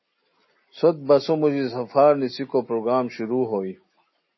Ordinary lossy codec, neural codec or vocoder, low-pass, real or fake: MP3, 24 kbps; none; 7.2 kHz; real